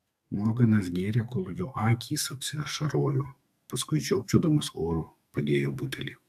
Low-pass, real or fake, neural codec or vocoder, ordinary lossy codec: 14.4 kHz; fake; codec, 44.1 kHz, 2.6 kbps, SNAC; AAC, 96 kbps